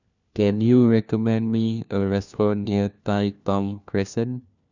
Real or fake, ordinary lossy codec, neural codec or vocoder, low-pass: fake; none; codec, 16 kHz, 1 kbps, FunCodec, trained on LibriTTS, 50 frames a second; 7.2 kHz